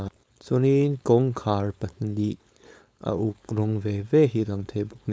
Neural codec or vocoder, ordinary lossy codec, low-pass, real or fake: codec, 16 kHz, 4.8 kbps, FACodec; none; none; fake